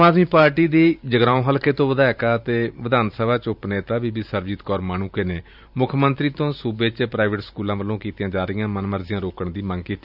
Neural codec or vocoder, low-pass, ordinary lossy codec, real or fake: vocoder, 44.1 kHz, 128 mel bands every 512 samples, BigVGAN v2; 5.4 kHz; none; fake